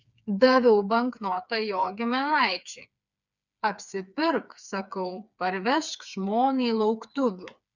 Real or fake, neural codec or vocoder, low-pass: fake; codec, 16 kHz, 4 kbps, FreqCodec, smaller model; 7.2 kHz